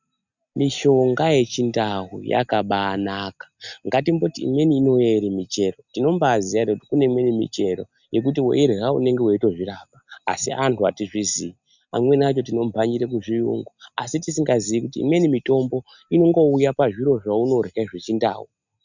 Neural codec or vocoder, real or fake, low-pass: none; real; 7.2 kHz